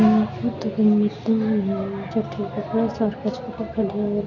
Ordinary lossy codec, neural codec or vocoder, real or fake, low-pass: none; none; real; 7.2 kHz